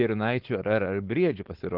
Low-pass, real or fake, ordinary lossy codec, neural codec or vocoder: 5.4 kHz; fake; Opus, 16 kbps; codec, 16 kHz, 4.8 kbps, FACodec